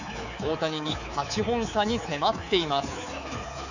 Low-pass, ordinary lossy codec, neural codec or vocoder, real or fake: 7.2 kHz; none; codec, 24 kHz, 3.1 kbps, DualCodec; fake